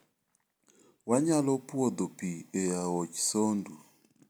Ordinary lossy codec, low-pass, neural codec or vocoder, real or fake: none; none; none; real